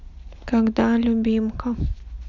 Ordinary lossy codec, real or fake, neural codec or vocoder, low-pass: none; real; none; 7.2 kHz